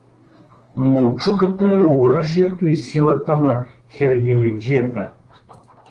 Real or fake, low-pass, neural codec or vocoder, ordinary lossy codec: fake; 10.8 kHz; codec, 24 kHz, 1 kbps, SNAC; Opus, 24 kbps